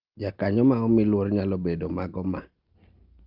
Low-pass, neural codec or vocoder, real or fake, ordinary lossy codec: 5.4 kHz; none; real; Opus, 32 kbps